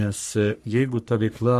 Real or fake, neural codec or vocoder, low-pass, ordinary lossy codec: fake; codec, 44.1 kHz, 3.4 kbps, Pupu-Codec; 14.4 kHz; MP3, 64 kbps